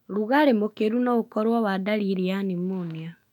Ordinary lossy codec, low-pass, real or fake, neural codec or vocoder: none; 19.8 kHz; fake; autoencoder, 48 kHz, 128 numbers a frame, DAC-VAE, trained on Japanese speech